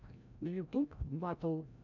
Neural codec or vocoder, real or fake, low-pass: codec, 16 kHz, 0.5 kbps, FreqCodec, larger model; fake; 7.2 kHz